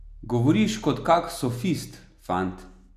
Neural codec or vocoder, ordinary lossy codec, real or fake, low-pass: none; none; real; 14.4 kHz